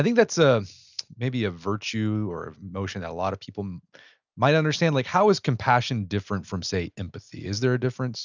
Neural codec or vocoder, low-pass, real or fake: none; 7.2 kHz; real